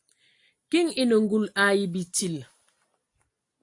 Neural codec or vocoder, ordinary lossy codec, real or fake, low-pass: none; AAC, 48 kbps; real; 10.8 kHz